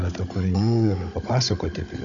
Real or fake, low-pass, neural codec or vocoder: fake; 7.2 kHz; codec, 16 kHz, 16 kbps, FunCodec, trained on Chinese and English, 50 frames a second